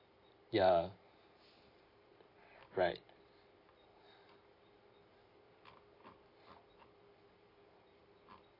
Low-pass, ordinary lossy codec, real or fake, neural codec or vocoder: 5.4 kHz; AAC, 24 kbps; real; none